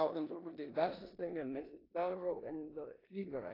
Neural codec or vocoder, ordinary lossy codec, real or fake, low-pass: codec, 16 kHz in and 24 kHz out, 0.9 kbps, LongCat-Audio-Codec, four codebook decoder; AAC, 24 kbps; fake; 5.4 kHz